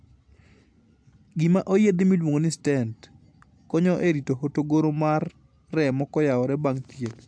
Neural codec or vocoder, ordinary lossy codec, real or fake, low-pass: none; AAC, 64 kbps; real; 9.9 kHz